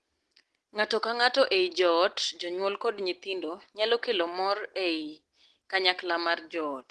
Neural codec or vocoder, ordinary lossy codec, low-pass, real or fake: none; Opus, 16 kbps; 10.8 kHz; real